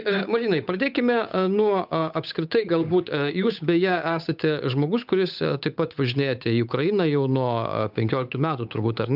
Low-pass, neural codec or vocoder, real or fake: 5.4 kHz; codec, 16 kHz, 8 kbps, FunCodec, trained on Chinese and English, 25 frames a second; fake